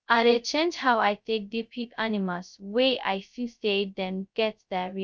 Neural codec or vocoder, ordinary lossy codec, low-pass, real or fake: codec, 16 kHz, 0.2 kbps, FocalCodec; none; none; fake